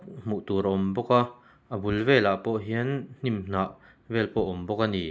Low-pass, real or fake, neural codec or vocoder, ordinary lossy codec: none; real; none; none